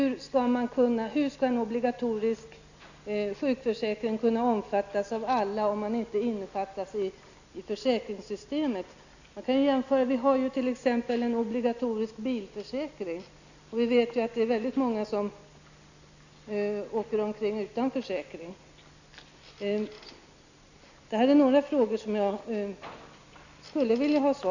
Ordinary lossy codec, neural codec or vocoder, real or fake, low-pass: none; none; real; 7.2 kHz